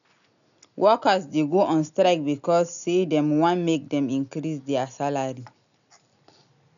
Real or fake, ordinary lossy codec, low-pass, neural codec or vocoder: real; none; 7.2 kHz; none